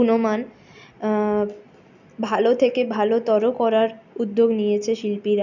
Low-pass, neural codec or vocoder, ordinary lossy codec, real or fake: 7.2 kHz; none; none; real